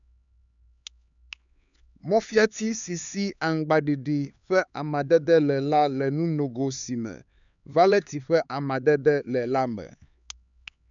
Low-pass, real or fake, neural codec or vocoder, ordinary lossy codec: 7.2 kHz; fake; codec, 16 kHz, 4 kbps, X-Codec, HuBERT features, trained on LibriSpeech; none